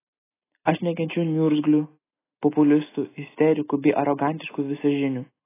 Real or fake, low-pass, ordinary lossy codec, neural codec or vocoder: real; 3.6 kHz; AAC, 16 kbps; none